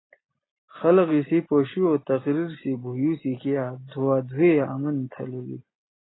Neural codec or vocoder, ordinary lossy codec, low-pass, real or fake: none; AAC, 16 kbps; 7.2 kHz; real